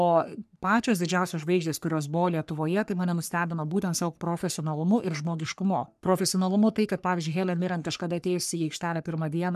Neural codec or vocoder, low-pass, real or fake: codec, 44.1 kHz, 3.4 kbps, Pupu-Codec; 14.4 kHz; fake